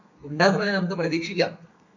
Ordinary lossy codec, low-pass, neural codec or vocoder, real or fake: MP3, 48 kbps; 7.2 kHz; codec, 32 kHz, 1.9 kbps, SNAC; fake